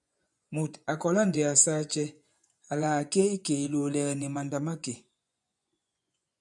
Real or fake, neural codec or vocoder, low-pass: fake; vocoder, 24 kHz, 100 mel bands, Vocos; 10.8 kHz